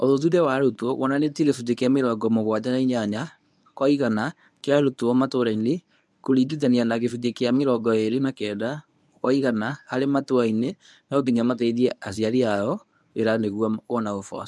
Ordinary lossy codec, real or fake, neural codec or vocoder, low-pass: none; fake; codec, 24 kHz, 0.9 kbps, WavTokenizer, medium speech release version 1; none